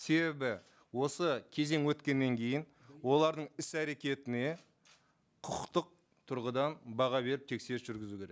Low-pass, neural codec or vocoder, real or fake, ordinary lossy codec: none; none; real; none